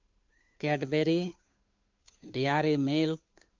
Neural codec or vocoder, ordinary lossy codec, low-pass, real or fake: codec, 16 kHz, 2 kbps, FunCodec, trained on Chinese and English, 25 frames a second; MP3, 64 kbps; 7.2 kHz; fake